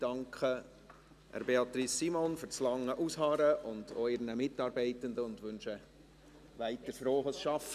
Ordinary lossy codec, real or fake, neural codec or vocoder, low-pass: none; real; none; 14.4 kHz